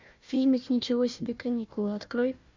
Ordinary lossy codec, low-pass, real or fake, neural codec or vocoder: MP3, 48 kbps; 7.2 kHz; fake; codec, 16 kHz, 1 kbps, FunCodec, trained on Chinese and English, 50 frames a second